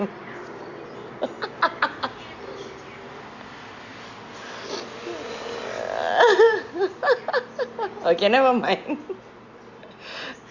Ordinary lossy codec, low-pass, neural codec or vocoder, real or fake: Opus, 64 kbps; 7.2 kHz; none; real